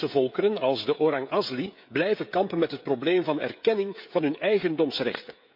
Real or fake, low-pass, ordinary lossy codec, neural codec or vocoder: fake; 5.4 kHz; MP3, 48 kbps; codec, 16 kHz, 16 kbps, FreqCodec, smaller model